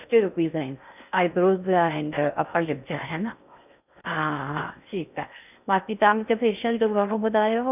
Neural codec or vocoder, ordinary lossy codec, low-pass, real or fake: codec, 16 kHz in and 24 kHz out, 0.6 kbps, FocalCodec, streaming, 4096 codes; none; 3.6 kHz; fake